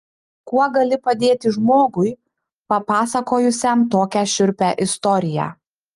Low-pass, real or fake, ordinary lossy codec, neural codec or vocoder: 10.8 kHz; real; Opus, 32 kbps; none